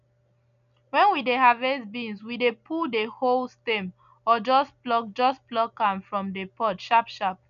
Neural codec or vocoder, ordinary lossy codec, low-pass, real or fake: none; none; 7.2 kHz; real